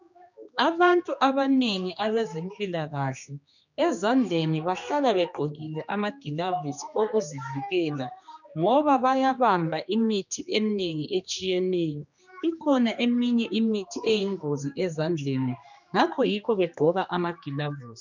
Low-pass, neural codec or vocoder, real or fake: 7.2 kHz; codec, 16 kHz, 2 kbps, X-Codec, HuBERT features, trained on general audio; fake